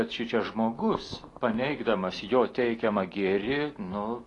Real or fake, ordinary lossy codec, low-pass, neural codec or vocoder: real; AAC, 32 kbps; 10.8 kHz; none